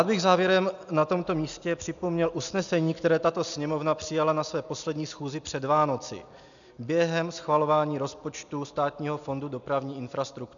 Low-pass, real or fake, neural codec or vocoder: 7.2 kHz; real; none